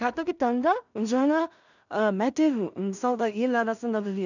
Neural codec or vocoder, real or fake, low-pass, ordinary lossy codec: codec, 16 kHz in and 24 kHz out, 0.4 kbps, LongCat-Audio-Codec, two codebook decoder; fake; 7.2 kHz; none